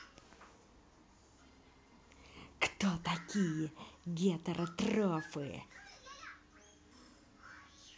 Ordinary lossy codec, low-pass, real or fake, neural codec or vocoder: none; none; real; none